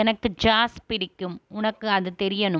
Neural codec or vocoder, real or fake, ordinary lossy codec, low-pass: none; real; none; none